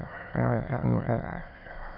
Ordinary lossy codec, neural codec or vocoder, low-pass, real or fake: AAC, 32 kbps; autoencoder, 22.05 kHz, a latent of 192 numbers a frame, VITS, trained on many speakers; 5.4 kHz; fake